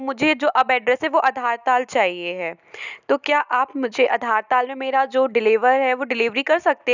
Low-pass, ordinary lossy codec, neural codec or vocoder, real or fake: 7.2 kHz; none; none; real